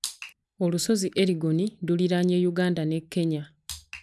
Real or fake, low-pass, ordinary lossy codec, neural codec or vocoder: real; none; none; none